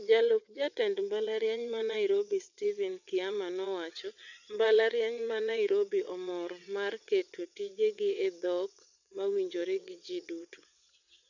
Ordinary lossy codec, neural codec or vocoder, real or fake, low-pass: none; vocoder, 22.05 kHz, 80 mel bands, WaveNeXt; fake; 7.2 kHz